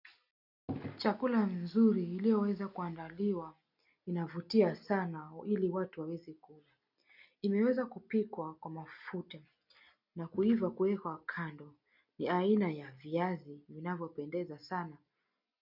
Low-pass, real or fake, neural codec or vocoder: 5.4 kHz; real; none